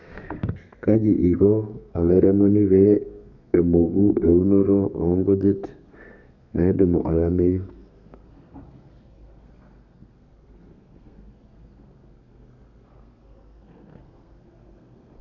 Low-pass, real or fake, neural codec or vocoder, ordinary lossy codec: 7.2 kHz; fake; codec, 32 kHz, 1.9 kbps, SNAC; none